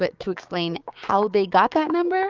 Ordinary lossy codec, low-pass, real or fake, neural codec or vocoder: Opus, 24 kbps; 7.2 kHz; fake; codec, 16 kHz, 6 kbps, DAC